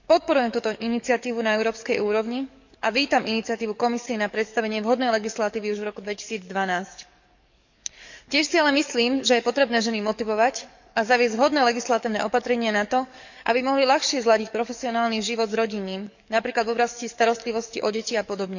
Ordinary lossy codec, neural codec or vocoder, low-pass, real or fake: none; codec, 44.1 kHz, 7.8 kbps, DAC; 7.2 kHz; fake